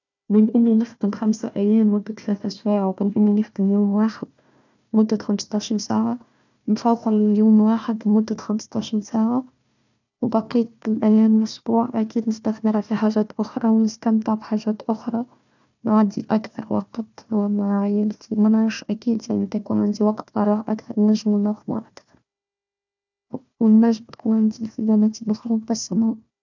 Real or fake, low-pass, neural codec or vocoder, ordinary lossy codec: fake; 7.2 kHz; codec, 16 kHz, 1 kbps, FunCodec, trained on Chinese and English, 50 frames a second; none